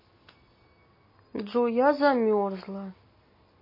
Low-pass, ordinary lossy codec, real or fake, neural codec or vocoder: 5.4 kHz; MP3, 24 kbps; real; none